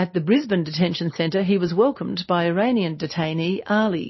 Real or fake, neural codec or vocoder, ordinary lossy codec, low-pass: real; none; MP3, 24 kbps; 7.2 kHz